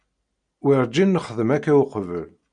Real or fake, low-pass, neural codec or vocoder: real; 9.9 kHz; none